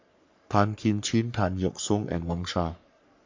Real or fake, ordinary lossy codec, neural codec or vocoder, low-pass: fake; MP3, 48 kbps; codec, 44.1 kHz, 3.4 kbps, Pupu-Codec; 7.2 kHz